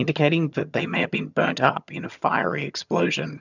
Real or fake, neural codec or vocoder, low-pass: fake; vocoder, 22.05 kHz, 80 mel bands, HiFi-GAN; 7.2 kHz